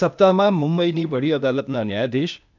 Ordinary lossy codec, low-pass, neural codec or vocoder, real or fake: none; 7.2 kHz; codec, 16 kHz, 0.8 kbps, ZipCodec; fake